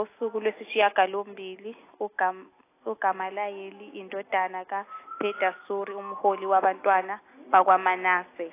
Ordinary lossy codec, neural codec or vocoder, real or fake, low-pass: AAC, 24 kbps; none; real; 3.6 kHz